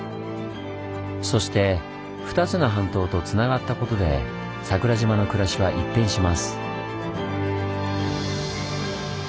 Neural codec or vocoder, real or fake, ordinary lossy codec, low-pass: none; real; none; none